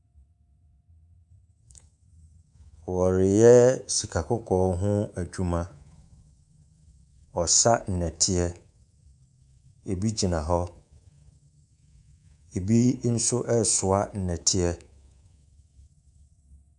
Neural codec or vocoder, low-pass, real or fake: codec, 24 kHz, 3.1 kbps, DualCodec; 10.8 kHz; fake